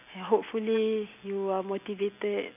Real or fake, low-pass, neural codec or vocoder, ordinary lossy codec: real; 3.6 kHz; none; none